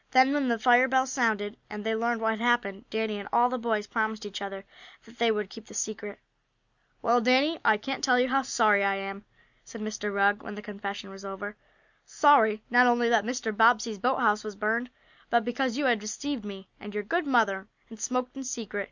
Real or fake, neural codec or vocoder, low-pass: real; none; 7.2 kHz